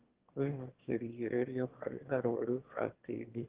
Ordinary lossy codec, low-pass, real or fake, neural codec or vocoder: Opus, 16 kbps; 3.6 kHz; fake; autoencoder, 22.05 kHz, a latent of 192 numbers a frame, VITS, trained on one speaker